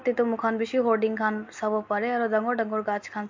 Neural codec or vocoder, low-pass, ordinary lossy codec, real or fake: none; 7.2 kHz; MP3, 48 kbps; real